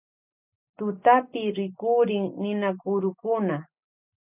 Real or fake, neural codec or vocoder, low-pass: real; none; 3.6 kHz